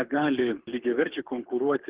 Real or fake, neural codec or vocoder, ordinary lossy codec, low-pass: fake; codec, 24 kHz, 6 kbps, HILCodec; Opus, 16 kbps; 3.6 kHz